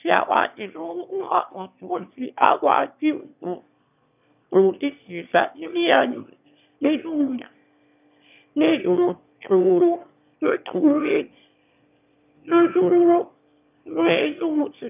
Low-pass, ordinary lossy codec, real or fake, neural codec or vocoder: 3.6 kHz; none; fake; autoencoder, 22.05 kHz, a latent of 192 numbers a frame, VITS, trained on one speaker